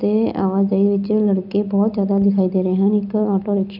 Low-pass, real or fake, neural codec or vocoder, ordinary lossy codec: 5.4 kHz; real; none; none